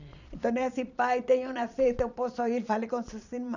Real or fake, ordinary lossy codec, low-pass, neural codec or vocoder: fake; none; 7.2 kHz; vocoder, 22.05 kHz, 80 mel bands, Vocos